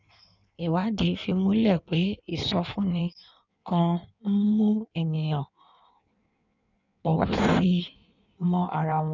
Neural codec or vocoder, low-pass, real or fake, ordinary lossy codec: codec, 16 kHz in and 24 kHz out, 1.1 kbps, FireRedTTS-2 codec; 7.2 kHz; fake; none